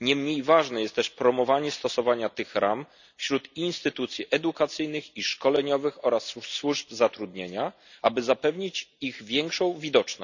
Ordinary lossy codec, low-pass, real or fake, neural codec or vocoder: none; 7.2 kHz; real; none